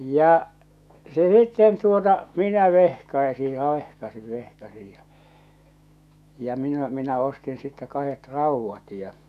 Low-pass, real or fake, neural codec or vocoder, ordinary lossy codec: 14.4 kHz; fake; autoencoder, 48 kHz, 128 numbers a frame, DAC-VAE, trained on Japanese speech; none